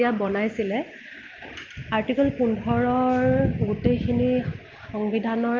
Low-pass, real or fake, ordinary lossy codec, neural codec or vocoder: 7.2 kHz; real; Opus, 32 kbps; none